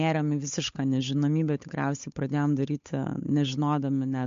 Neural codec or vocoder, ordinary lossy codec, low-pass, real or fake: codec, 16 kHz, 8 kbps, FunCodec, trained on Chinese and English, 25 frames a second; MP3, 48 kbps; 7.2 kHz; fake